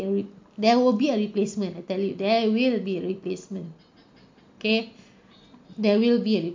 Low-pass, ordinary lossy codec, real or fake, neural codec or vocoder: 7.2 kHz; MP3, 48 kbps; real; none